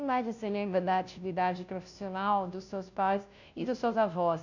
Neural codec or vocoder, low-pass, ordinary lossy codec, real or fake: codec, 16 kHz, 0.5 kbps, FunCodec, trained on Chinese and English, 25 frames a second; 7.2 kHz; none; fake